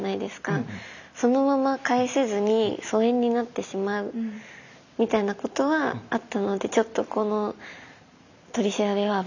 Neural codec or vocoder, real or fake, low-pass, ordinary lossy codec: none; real; 7.2 kHz; none